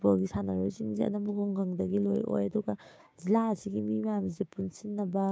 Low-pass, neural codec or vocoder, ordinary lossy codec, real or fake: none; codec, 16 kHz, 6 kbps, DAC; none; fake